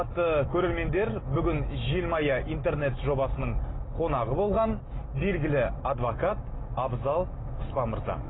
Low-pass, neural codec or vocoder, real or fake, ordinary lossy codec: 7.2 kHz; none; real; AAC, 16 kbps